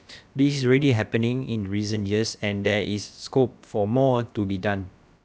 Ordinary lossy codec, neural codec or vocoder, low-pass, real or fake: none; codec, 16 kHz, about 1 kbps, DyCAST, with the encoder's durations; none; fake